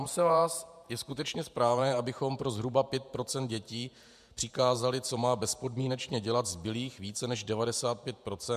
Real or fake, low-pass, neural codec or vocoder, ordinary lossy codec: fake; 14.4 kHz; vocoder, 48 kHz, 128 mel bands, Vocos; MP3, 96 kbps